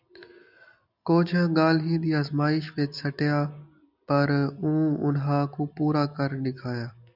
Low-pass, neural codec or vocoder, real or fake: 5.4 kHz; none; real